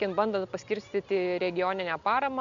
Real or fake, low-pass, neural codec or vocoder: real; 7.2 kHz; none